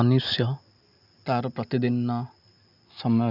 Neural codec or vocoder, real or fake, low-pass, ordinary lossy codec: none; real; 5.4 kHz; none